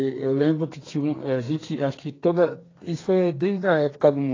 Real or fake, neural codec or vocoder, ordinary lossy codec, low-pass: fake; codec, 32 kHz, 1.9 kbps, SNAC; AAC, 32 kbps; 7.2 kHz